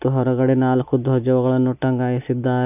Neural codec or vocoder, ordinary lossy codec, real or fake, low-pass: none; none; real; 3.6 kHz